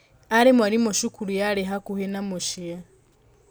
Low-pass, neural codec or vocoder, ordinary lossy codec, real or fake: none; none; none; real